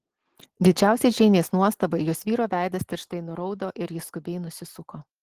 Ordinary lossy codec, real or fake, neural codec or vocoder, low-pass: Opus, 16 kbps; real; none; 14.4 kHz